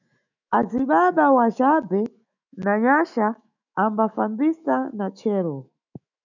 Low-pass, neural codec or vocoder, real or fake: 7.2 kHz; autoencoder, 48 kHz, 128 numbers a frame, DAC-VAE, trained on Japanese speech; fake